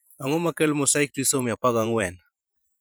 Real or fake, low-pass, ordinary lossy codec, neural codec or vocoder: fake; none; none; vocoder, 44.1 kHz, 128 mel bands every 512 samples, BigVGAN v2